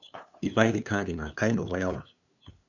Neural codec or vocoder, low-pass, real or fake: codec, 16 kHz, 2 kbps, FunCodec, trained on LibriTTS, 25 frames a second; 7.2 kHz; fake